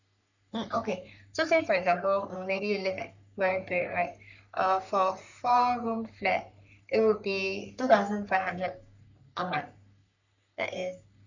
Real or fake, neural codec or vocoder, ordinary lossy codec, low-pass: fake; codec, 44.1 kHz, 3.4 kbps, Pupu-Codec; none; 7.2 kHz